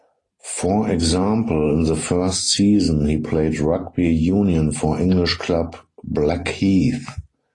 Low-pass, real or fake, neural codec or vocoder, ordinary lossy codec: 10.8 kHz; real; none; AAC, 32 kbps